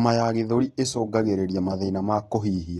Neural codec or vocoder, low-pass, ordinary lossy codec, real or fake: none; 19.8 kHz; AAC, 32 kbps; real